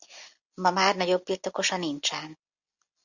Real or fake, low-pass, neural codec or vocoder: real; 7.2 kHz; none